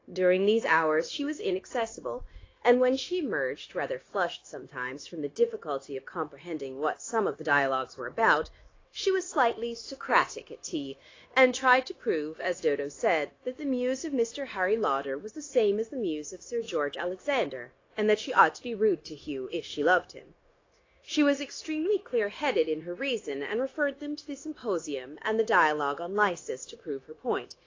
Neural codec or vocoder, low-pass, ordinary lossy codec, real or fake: codec, 16 kHz, 0.9 kbps, LongCat-Audio-Codec; 7.2 kHz; AAC, 32 kbps; fake